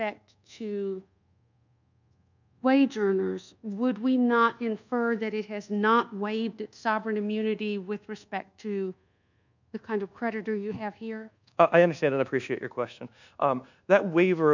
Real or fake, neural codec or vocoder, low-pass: fake; codec, 24 kHz, 1.2 kbps, DualCodec; 7.2 kHz